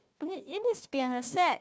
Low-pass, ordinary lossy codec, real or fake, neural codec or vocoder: none; none; fake; codec, 16 kHz, 1 kbps, FunCodec, trained on Chinese and English, 50 frames a second